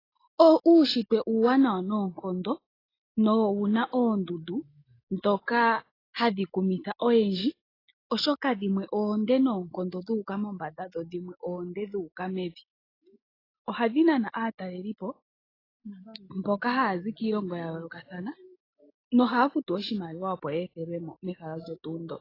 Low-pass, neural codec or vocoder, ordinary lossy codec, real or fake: 5.4 kHz; none; AAC, 24 kbps; real